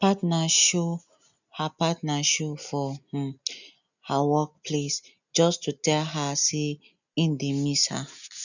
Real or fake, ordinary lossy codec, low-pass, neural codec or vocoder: real; none; 7.2 kHz; none